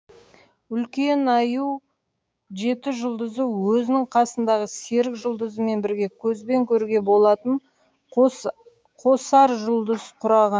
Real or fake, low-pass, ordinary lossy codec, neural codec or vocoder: fake; none; none; codec, 16 kHz, 6 kbps, DAC